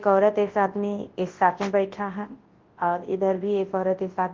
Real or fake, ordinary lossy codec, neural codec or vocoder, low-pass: fake; Opus, 16 kbps; codec, 24 kHz, 0.9 kbps, WavTokenizer, large speech release; 7.2 kHz